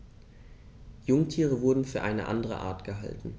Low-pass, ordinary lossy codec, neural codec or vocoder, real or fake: none; none; none; real